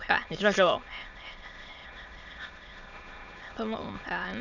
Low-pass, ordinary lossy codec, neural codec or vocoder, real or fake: 7.2 kHz; none; autoencoder, 22.05 kHz, a latent of 192 numbers a frame, VITS, trained on many speakers; fake